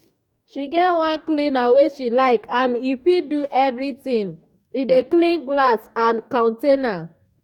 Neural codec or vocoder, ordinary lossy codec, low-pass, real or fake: codec, 44.1 kHz, 2.6 kbps, DAC; none; 19.8 kHz; fake